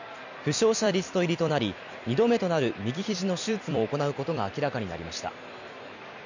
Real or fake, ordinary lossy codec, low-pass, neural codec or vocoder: fake; none; 7.2 kHz; vocoder, 44.1 kHz, 128 mel bands every 256 samples, BigVGAN v2